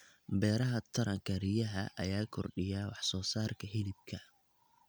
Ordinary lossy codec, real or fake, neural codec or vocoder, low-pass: none; real; none; none